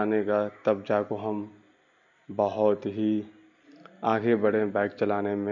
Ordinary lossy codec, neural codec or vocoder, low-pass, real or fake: none; none; 7.2 kHz; real